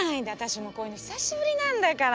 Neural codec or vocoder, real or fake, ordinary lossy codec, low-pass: none; real; none; none